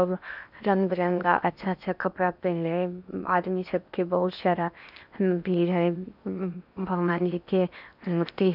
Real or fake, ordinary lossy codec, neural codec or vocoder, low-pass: fake; none; codec, 16 kHz in and 24 kHz out, 0.8 kbps, FocalCodec, streaming, 65536 codes; 5.4 kHz